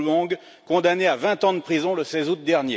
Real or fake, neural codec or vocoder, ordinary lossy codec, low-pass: real; none; none; none